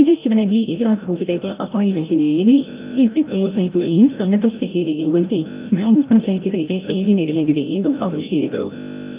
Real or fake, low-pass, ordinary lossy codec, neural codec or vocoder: fake; 3.6 kHz; Opus, 24 kbps; codec, 16 kHz, 0.5 kbps, FreqCodec, larger model